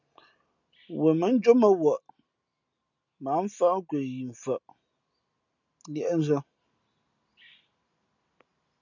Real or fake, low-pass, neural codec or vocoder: real; 7.2 kHz; none